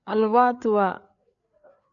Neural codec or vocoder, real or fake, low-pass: codec, 16 kHz, 4 kbps, FreqCodec, larger model; fake; 7.2 kHz